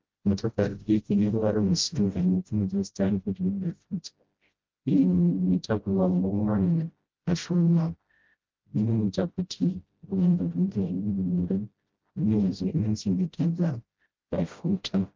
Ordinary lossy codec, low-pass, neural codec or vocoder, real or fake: Opus, 32 kbps; 7.2 kHz; codec, 16 kHz, 0.5 kbps, FreqCodec, smaller model; fake